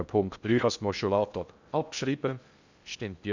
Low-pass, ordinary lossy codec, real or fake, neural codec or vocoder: 7.2 kHz; none; fake; codec, 16 kHz in and 24 kHz out, 0.6 kbps, FocalCodec, streaming, 2048 codes